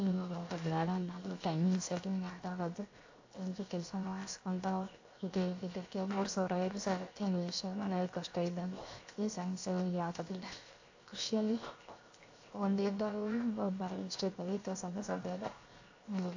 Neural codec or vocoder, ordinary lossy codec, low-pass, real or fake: codec, 16 kHz, 0.7 kbps, FocalCodec; AAC, 48 kbps; 7.2 kHz; fake